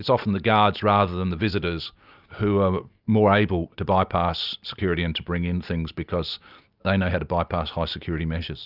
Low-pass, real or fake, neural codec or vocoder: 5.4 kHz; real; none